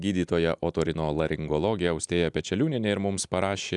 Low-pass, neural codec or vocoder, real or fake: 10.8 kHz; none; real